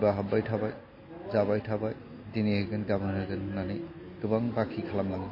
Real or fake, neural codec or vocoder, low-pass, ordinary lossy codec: real; none; 5.4 kHz; MP3, 24 kbps